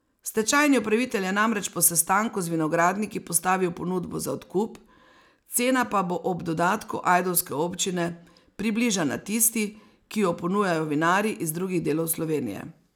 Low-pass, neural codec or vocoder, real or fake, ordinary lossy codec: none; none; real; none